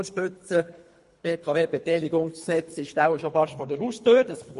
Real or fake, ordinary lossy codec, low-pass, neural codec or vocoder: fake; MP3, 48 kbps; 10.8 kHz; codec, 24 kHz, 3 kbps, HILCodec